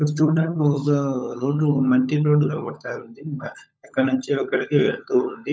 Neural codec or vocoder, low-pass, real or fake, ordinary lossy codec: codec, 16 kHz, 16 kbps, FunCodec, trained on LibriTTS, 50 frames a second; none; fake; none